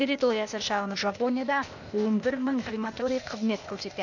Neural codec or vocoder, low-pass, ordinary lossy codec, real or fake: codec, 16 kHz, 0.8 kbps, ZipCodec; 7.2 kHz; none; fake